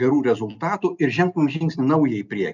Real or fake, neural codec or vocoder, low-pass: real; none; 7.2 kHz